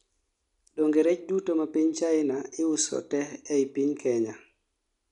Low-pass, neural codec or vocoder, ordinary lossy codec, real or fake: 10.8 kHz; none; none; real